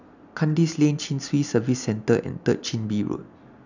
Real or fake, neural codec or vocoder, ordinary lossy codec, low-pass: real; none; none; 7.2 kHz